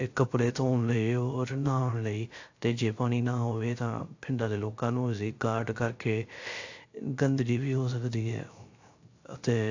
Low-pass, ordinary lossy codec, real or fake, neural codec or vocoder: 7.2 kHz; AAC, 48 kbps; fake; codec, 16 kHz, 0.3 kbps, FocalCodec